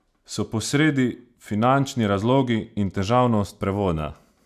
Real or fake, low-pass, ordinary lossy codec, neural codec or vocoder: real; 14.4 kHz; none; none